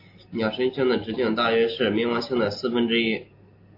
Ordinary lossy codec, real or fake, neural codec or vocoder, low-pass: AAC, 32 kbps; real; none; 5.4 kHz